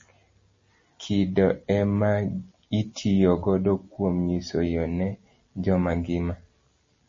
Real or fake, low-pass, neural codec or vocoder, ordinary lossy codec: real; 7.2 kHz; none; MP3, 32 kbps